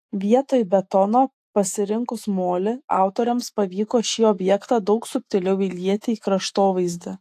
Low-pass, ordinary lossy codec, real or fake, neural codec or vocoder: 14.4 kHz; AAC, 64 kbps; fake; autoencoder, 48 kHz, 128 numbers a frame, DAC-VAE, trained on Japanese speech